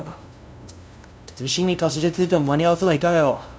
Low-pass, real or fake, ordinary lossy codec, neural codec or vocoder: none; fake; none; codec, 16 kHz, 0.5 kbps, FunCodec, trained on LibriTTS, 25 frames a second